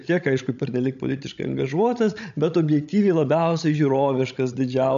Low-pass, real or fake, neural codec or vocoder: 7.2 kHz; fake; codec, 16 kHz, 16 kbps, FreqCodec, larger model